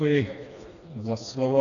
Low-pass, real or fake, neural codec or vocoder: 7.2 kHz; fake; codec, 16 kHz, 2 kbps, FreqCodec, smaller model